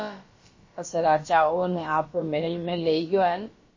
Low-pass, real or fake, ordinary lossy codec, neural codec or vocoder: 7.2 kHz; fake; MP3, 32 kbps; codec, 16 kHz, about 1 kbps, DyCAST, with the encoder's durations